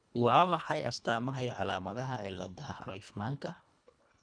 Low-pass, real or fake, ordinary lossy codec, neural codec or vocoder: 9.9 kHz; fake; none; codec, 24 kHz, 1.5 kbps, HILCodec